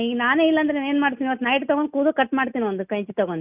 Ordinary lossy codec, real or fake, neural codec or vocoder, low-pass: none; real; none; 3.6 kHz